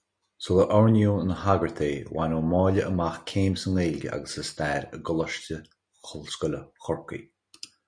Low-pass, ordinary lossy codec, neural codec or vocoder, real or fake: 9.9 kHz; Opus, 64 kbps; none; real